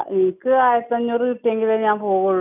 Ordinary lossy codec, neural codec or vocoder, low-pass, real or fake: none; none; 3.6 kHz; real